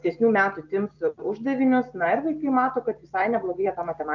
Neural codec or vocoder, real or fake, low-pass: none; real; 7.2 kHz